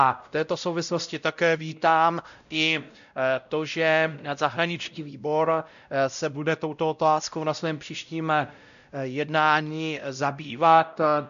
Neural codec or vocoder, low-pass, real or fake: codec, 16 kHz, 0.5 kbps, X-Codec, WavLM features, trained on Multilingual LibriSpeech; 7.2 kHz; fake